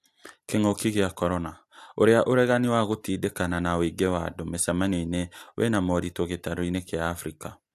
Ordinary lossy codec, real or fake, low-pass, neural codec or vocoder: AAC, 96 kbps; real; 14.4 kHz; none